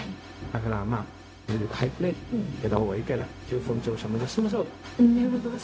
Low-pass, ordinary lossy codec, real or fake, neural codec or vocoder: none; none; fake; codec, 16 kHz, 0.4 kbps, LongCat-Audio-Codec